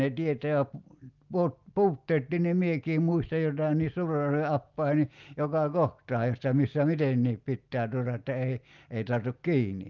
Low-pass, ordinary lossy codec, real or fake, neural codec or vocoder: 7.2 kHz; Opus, 32 kbps; real; none